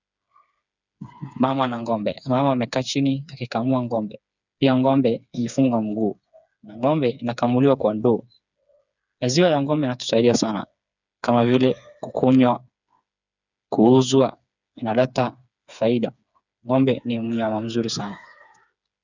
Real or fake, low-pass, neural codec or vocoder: fake; 7.2 kHz; codec, 16 kHz, 4 kbps, FreqCodec, smaller model